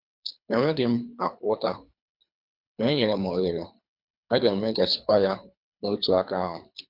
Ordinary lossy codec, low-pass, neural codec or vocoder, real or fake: MP3, 48 kbps; 5.4 kHz; codec, 24 kHz, 3 kbps, HILCodec; fake